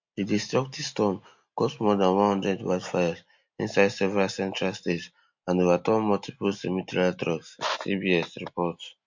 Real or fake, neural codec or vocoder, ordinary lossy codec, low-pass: real; none; MP3, 48 kbps; 7.2 kHz